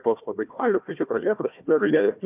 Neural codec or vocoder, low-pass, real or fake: codec, 16 kHz, 1 kbps, FunCodec, trained on Chinese and English, 50 frames a second; 3.6 kHz; fake